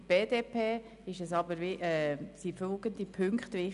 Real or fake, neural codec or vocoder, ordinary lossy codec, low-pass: real; none; none; 10.8 kHz